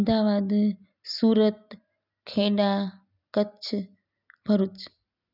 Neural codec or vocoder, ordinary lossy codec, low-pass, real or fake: none; none; 5.4 kHz; real